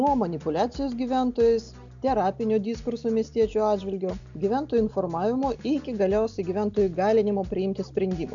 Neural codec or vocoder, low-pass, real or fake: none; 7.2 kHz; real